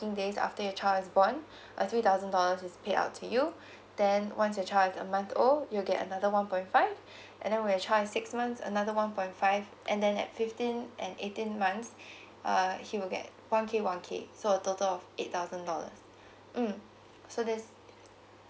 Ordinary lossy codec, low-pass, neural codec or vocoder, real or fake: none; none; none; real